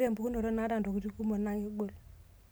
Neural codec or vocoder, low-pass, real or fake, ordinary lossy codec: none; none; real; none